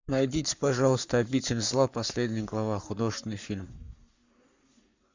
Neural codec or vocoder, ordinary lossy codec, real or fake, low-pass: codec, 44.1 kHz, 7.8 kbps, Pupu-Codec; Opus, 64 kbps; fake; 7.2 kHz